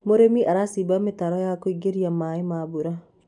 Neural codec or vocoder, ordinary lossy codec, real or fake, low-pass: none; none; real; 10.8 kHz